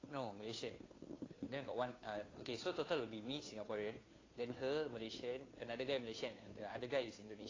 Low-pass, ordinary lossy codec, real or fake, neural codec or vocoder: 7.2 kHz; AAC, 32 kbps; fake; codec, 16 kHz, 2 kbps, FunCodec, trained on Chinese and English, 25 frames a second